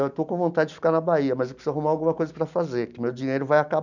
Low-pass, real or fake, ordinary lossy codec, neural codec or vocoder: 7.2 kHz; real; none; none